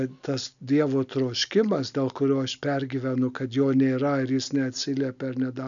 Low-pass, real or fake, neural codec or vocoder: 7.2 kHz; real; none